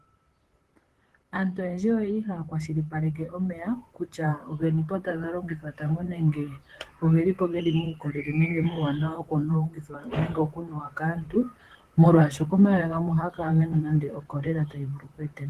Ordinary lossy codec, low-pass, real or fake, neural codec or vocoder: Opus, 16 kbps; 14.4 kHz; fake; vocoder, 44.1 kHz, 128 mel bands, Pupu-Vocoder